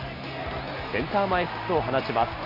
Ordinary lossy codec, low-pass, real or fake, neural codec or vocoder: none; 5.4 kHz; real; none